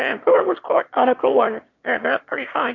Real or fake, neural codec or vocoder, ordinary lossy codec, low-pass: fake; autoencoder, 22.05 kHz, a latent of 192 numbers a frame, VITS, trained on one speaker; MP3, 48 kbps; 7.2 kHz